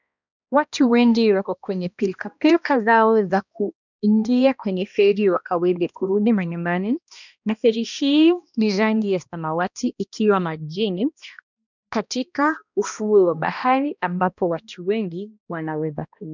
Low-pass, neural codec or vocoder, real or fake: 7.2 kHz; codec, 16 kHz, 1 kbps, X-Codec, HuBERT features, trained on balanced general audio; fake